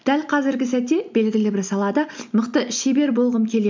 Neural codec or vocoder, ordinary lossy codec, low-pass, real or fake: none; MP3, 64 kbps; 7.2 kHz; real